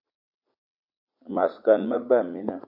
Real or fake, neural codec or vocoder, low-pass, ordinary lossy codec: fake; vocoder, 44.1 kHz, 80 mel bands, Vocos; 5.4 kHz; AAC, 48 kbps